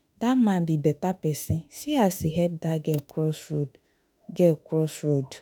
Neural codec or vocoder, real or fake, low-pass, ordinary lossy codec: autoencoder, 48 kHz, 32 numbers a frame, DAC-VAE, trained on Japanese speech; fake; none; none